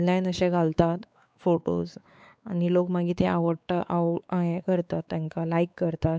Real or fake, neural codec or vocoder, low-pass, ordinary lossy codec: fake; codec, 16 kHz, 4 kbps, X-Codec, WavLM features, trained on Multilingual LibriSpeech; none; none